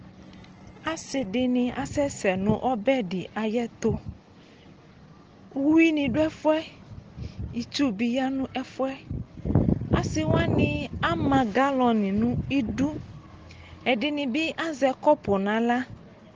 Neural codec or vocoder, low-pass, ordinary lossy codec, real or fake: none; 7.2 kHz; Opus, 16 kbps; real